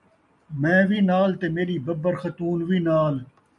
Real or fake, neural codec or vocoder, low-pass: real; none; 10.8 kHz